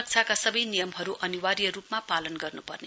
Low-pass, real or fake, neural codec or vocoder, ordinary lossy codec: none; real; none; none